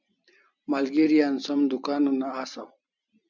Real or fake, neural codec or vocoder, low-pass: fake; vocoder, 44.1 kHz, 128 mel bands every 256 samples, BigVGAN v2; 7.2 kHz